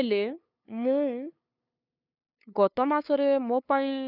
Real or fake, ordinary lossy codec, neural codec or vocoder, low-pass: fake; none; codec, 16 kHz, 2 kbps, FunCodec, trained on LibriTTS, 25 frames a second; 5.4 kHz